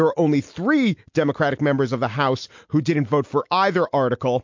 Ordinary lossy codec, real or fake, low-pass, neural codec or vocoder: MP3, 48 kbps; real; 7.2 kHz; none